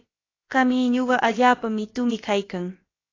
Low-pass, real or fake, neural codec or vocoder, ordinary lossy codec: 7.2 kHz; fake; codec, 16 kHz, about 1 kbps, DyCAST, with the encoder's durations; AAC, 32 kbps